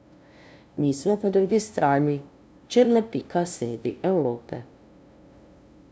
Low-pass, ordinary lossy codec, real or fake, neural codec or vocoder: none; none; fake; codec, 16 kHz, 0.5 kbps, FunCodec, trained on LibriTTS, 25 frames a second